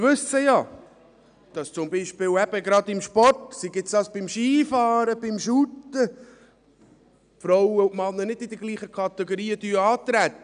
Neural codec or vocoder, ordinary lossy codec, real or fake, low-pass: none; none; real; 9.9 kHz